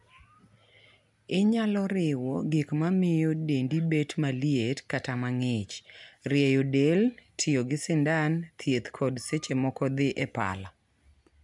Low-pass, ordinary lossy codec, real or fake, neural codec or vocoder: 10.8 kHz; none; real; none